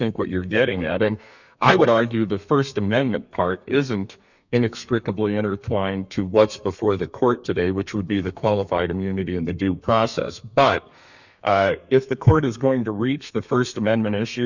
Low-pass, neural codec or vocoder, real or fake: 7.2 kHz; codec, 32 kHz, 1.9 kbps, SNAC; fake